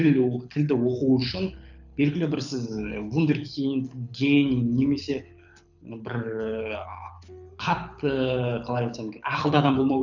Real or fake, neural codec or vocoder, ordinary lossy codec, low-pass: fake; codec, 24 kHz, 6 kbps, HILCodec; none; 7.2 kHz